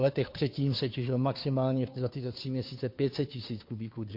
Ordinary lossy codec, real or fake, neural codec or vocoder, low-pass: MP3, 32 kbps; fake; codec, 16 kHz, 2 kbps, FunCodec, trained on Chinese and English, 25 frames a second; 5.4 kHz